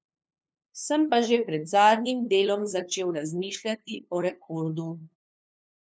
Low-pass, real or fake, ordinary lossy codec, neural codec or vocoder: none; fake; none; codec, 16 kHz, 2 kbps, FunCodec, trained on LibriTTS, 25 frames a second